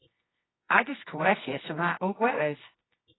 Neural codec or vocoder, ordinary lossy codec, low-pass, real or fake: codec, 24 kHz, 0.9 kbps, WavTokenizer, medium music audio release; AAC, 16 kbps; 7.2 kHz; fake